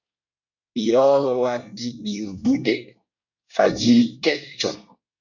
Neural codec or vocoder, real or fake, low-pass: codec, 24 kHz, 1 kbps, SNAC; fake; 7.2 kHz